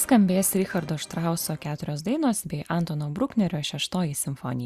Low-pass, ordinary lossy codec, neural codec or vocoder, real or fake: 14.4 kHz; Opus, 64 kbps; none; real